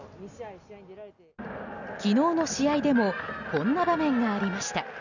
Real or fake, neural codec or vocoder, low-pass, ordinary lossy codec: real; none; 7.2 kHz; none